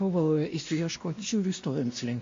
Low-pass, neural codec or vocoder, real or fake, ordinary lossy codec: 7.2 kHz; codec, 16 kHz, 1 kbps, X-Codec, WavLM features, trained on Multilingual LibriSpeech; fake; AAC, 48 kbps